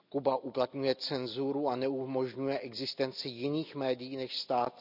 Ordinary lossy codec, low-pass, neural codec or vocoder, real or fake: none; 5.4 kHz; none; real